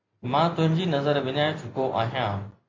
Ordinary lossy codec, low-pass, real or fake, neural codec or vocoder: MP3, 48 kbps; 7.2 kHz; real; none